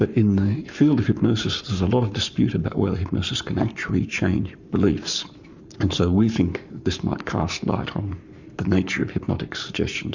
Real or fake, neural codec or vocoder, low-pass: fake; codec, 44.1 kHz, 7.8 kbps, Pupu-Codec; 7.2 kHz